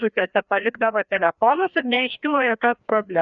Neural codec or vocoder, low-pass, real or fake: codec, 16 kHz, 1 kbps, FreqCodec, larger model; 7.2 kHz; fake